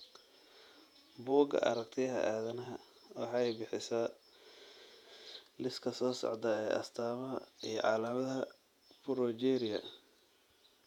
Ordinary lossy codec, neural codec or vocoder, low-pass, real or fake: none; vocoder, 48 kHz, 128 mel bands, Vocos; 19.8 kHz; fake